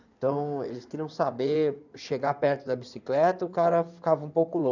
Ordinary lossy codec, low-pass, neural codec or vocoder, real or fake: none; 7.2 kHz; codec, 16 kHz in and 24 kHz out, 2.2 kbps, FireRedTTS-2 codec; fake